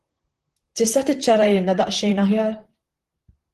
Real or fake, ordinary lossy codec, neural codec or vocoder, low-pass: fake; Opus, 16 kbps; vocoder, 44.1 kHz, 128 mel bands, Pupu-Vocoder; 14.4 kHz